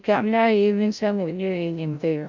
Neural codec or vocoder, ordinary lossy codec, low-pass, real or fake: codec, 16 kHz, 0.5 kbps, FreqCodec, larger model; none; 7.2 kHz; fake